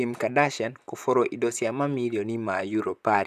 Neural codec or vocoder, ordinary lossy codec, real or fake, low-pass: vocoder, 44.1 kHz, 128 mel bands, Pupu-Vocoder; none; fake; 14.4 kHz